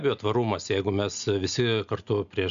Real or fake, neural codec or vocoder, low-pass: real; none; 7.2 kHz